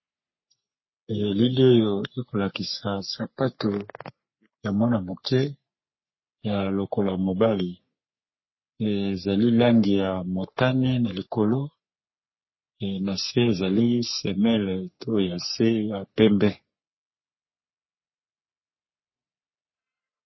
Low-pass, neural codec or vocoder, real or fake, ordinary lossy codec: 7.2 kHz; codec, 44.1 kHz, 3.4 kbps, Pupu-Codec; fake; MP3, 24 kbps